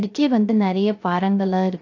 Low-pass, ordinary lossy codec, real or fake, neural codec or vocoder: 7.2 kHz; AAC, 48 kbps; fake; codec, 16 kHz, 0.3 kbps, FocalCodec